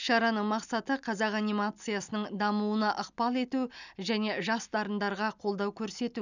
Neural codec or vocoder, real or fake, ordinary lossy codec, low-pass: none; real; none; 7.2 kHz